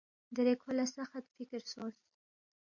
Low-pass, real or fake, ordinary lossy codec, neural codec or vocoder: 7.2 kHz; real; MP3, 48 kbps; none